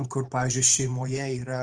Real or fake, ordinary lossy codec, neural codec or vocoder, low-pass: fake; Opus, 24 kbps; vocoder, 44.1 kHz, 128 mel bands every 512 samples, BigVGAN v2; 9.9 kHz